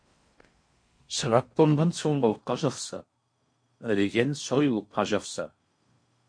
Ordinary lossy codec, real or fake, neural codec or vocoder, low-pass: MP3, 48 kbps; fake; codec, 16 kHz in and 24 kHz out, 0.6 kbps, FocalCodec, streaming, 4096 codes; 9.9 kHz